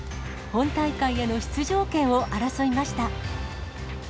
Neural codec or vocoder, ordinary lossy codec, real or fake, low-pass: none; none; real; none